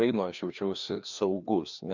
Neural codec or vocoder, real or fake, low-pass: codec, 16 kHz, 2 kbps, FreqCodec, larger model; fake; 7.2 kHz